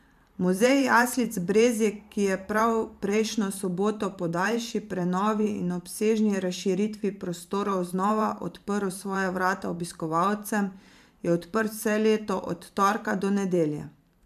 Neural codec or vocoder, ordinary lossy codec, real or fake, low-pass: vocoder, 44.1 kHz, 128 mel bands every 512 samples, BigVGAN v2; MP3, 96 kbps; fake; 14.4 kHz